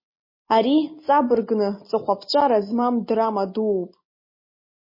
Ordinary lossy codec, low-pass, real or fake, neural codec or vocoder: MP3, 24 kbps; 5.4 kHz; real; none